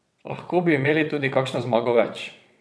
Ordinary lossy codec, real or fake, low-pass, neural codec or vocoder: none; fake; none; vocoder, 22.05 kHz, 80 mel bands, WaveNeXt